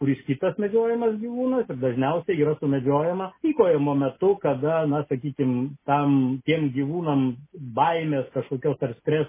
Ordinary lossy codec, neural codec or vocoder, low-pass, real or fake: MP3, 16 kbps; none; 3.6 kHz; real